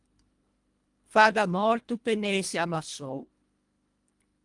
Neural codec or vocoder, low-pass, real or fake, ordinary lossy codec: codec, 24 kHz, 1.5 kbps, HILCodec; 10.8 kHz; fake; Opus, 32 kbps